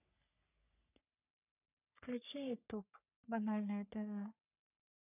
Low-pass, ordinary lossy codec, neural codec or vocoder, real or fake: 3.6 kHz; none; codec, 44.1 kHz, 3.4 kbps, Pupu-Codec; fake